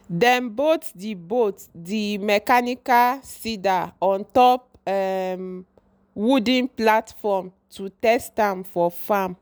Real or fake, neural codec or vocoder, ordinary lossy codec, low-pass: real; none; none; none